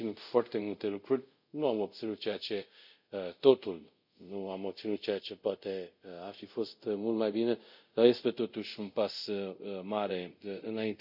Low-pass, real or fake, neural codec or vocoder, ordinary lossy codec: 5.4 kHz; fake; codec, 24 kHz, 0.5 kbps, DualCodec; none